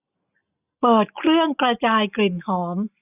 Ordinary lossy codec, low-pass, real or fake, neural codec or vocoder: none; 3.6 kHz; real; none